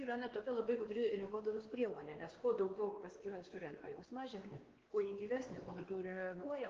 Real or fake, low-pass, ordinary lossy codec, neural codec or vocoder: fake; 7.2 kHz; Opus, 16 kbps; codec, 16 kHz, 2 kbps, X-Codec, WavLM features, trained on Multilingual LibriSpeech